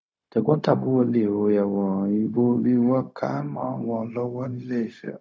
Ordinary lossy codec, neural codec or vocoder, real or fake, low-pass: none; codec, 16 kHz, 0.4 kbps, LongCat-Audio-Codec; fake; none